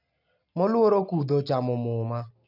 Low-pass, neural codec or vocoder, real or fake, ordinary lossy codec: 5.4 kHz; none; real; none